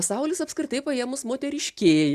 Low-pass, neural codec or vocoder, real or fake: 14.4 kHz; none; real